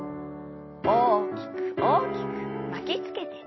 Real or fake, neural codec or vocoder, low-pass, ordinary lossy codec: real; none; 7.2 kHz; MP3, 24 kbps